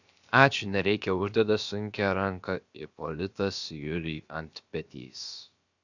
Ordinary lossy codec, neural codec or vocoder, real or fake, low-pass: Opus, 64 kbps; codec, 16 kHz, about 1 kbps, DyCAST, with the encoder's durations; fake; 7.2 kHz